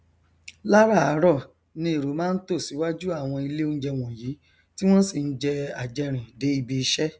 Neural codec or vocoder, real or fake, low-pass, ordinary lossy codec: none; real; none; none